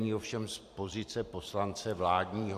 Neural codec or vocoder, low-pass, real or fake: vocoder, 44.1 kHz, 128 mel bands every 512 samples, BigVGAN v2; 14.4 kHz; fake